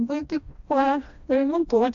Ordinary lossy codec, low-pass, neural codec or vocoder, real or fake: AAC, 64 kbps; 7.2 kHz; codec, 16 kHz, 1 kbps, FreqCodec, smaller model; fake